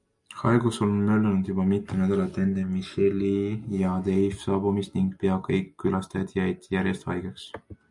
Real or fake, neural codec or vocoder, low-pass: real; none; 10.8 kHz